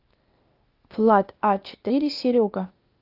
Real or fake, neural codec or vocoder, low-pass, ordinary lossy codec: fake; codec, 16 kHz, 0.8 kbps, ZipCodec; 5.4 kHz; Opus, 24 kbps